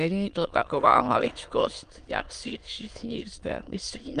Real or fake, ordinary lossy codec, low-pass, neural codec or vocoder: fake; Opus, 32 kbps; 9.9 kHz; autoencoder, 22.05 kHz, a latent of 192 numbers a frame, VITS, trained on many speakers